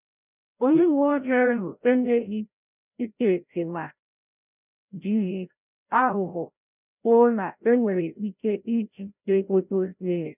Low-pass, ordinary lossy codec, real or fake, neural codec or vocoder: 3.6 kHz; none; fake; codec, 16 kHz, 0.5 kbps, FreqCodec, larger model